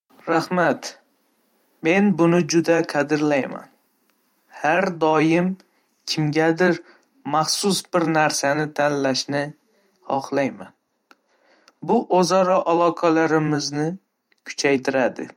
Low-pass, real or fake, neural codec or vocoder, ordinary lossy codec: 19.8 kHz; fake; vocoder, 44.1 kHz, 128 mel bands every 512 samples, BigVGAN v2; MP3, 64 kbps